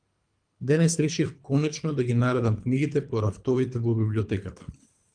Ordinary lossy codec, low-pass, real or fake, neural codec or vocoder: Opus, 32 kbps; 9.9 kHz; fake; codec, 24 kHz, 3 kbps, HILCodec